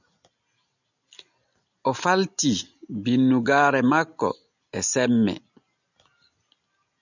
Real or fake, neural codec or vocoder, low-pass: real; none; 7.2 kHz